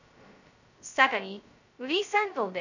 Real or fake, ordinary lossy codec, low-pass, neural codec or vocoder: fake; none; 7.2 kHz; codec, 16 kHz, 0.2 kbps, FocalCodec